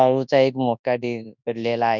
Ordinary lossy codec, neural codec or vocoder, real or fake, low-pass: none; codec, 24 kHz, 0.9 kbps, WavTokenizer, large speech release; fake; 7.2 kHz